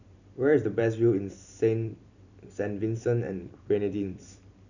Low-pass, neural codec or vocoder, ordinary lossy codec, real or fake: 7.2 kHz; vocoder, 44.1 kHz, 128 mel bands every 256 samples, BigVGAN v2; none; fake